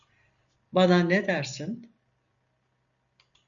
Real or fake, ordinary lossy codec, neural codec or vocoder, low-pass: real; MP3, 96 kbps; none; 7.2 kHz